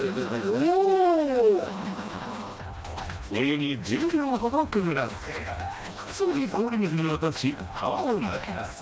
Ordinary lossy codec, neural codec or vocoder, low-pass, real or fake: none; codec, 16 kHz, 1 kbps, FreqCodec, smaller model; none; fake